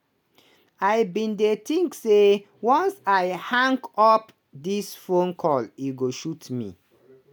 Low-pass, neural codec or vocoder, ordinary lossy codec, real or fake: none; none; none; real